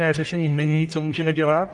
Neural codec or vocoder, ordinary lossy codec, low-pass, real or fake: codec, 44.1 kHz, 1.7 kbps, Pupu-Codec; Opus, 32 kbps; 10.8 kHz; fake